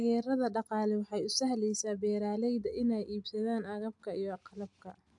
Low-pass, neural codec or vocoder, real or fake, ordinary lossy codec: 10.8 kHz; none; real; MP3, 64 kbps